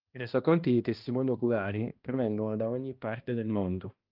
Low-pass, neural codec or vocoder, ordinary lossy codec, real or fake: 5.4 kHz; codec, 16 kHz, 1 kbps, X-Codec, HuBERT features, trained on balanced general audio; Opus, 24 kbps; fake